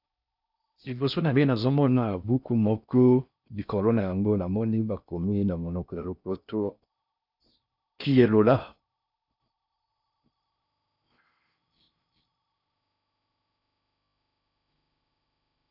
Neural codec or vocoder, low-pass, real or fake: codec, 16 kHz in and 24 kHz out, 0.6 kbps, FocalCodec, streaming, 4096 codes; 5.4 kHz; fake